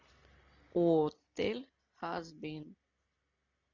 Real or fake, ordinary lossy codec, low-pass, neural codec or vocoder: real; MP3, 64 kbps; 7.2 kHz; none